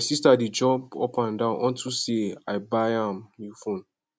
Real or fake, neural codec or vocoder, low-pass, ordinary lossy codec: real; none; none; none